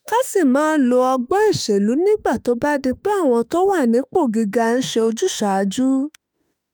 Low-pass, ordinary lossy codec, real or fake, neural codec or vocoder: none; none; fake; autoencoder, 48 kHz, 32 numbers a frame, DAC-VAE, trained on Japanese speech